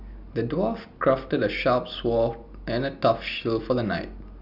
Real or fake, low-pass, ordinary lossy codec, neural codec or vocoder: real; 5.4 kHz; none; none